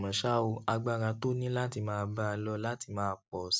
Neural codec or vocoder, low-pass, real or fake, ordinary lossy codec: none; none; real; none